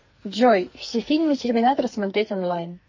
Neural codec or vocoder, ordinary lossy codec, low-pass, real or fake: codec, 44.1 kHz, 2.6 kbps, SNAC; MP3, 32 kbps; 7.2 kHz; fake